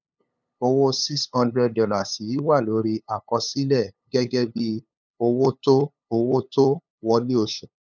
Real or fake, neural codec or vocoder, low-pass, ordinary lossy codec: fake; codec, 16 kHz, 8 kbps, FunCodec, trained on LibriTTS, 25 frames a second; 7.2 kHz; none